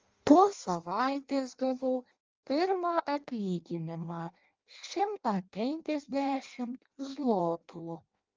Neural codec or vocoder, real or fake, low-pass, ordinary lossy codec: codec, 16 kHz in and 24 kHz out, 0.6 kbps, FireRedTTS-2 codec; fake; 7.2 kHz; Opus, 32 kbps